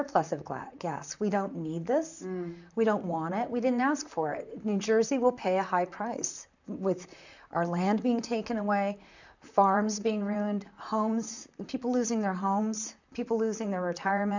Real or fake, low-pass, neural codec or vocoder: fake; 7.2 kHz; vocoder, 44.1 kHz, 128 mel bands, Pupu-Vocoder